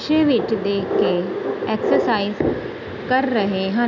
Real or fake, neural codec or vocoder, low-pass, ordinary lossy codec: real; none; 7.2 kHz; none